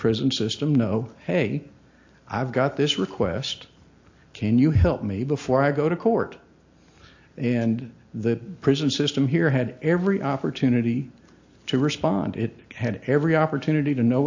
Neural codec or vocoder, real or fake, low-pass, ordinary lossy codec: none; real; 7.2 kHz; AAC, 48 kbps